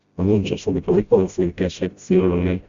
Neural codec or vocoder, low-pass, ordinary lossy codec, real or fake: codec, 16 kHz, 0.5 kbps, FreqCodec, smaller model; 7.2 kHz; Opus, 64 kbps; fake